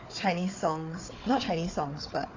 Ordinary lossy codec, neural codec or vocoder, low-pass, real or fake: AAC, 32 kbps; codec, 16 kHz, 4 kbps, FunCodec, trained on Chinese and English, 50 frames a second; 7.2 kHz; fake